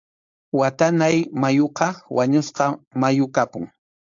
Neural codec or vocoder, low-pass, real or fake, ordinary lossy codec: codec, 16 kHz, 4.8 kbps, FACodec; 7.2 kHz; fake; AAC, 64 kbps